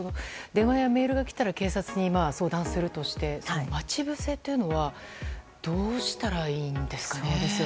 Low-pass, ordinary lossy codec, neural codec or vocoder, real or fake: none; none; none; real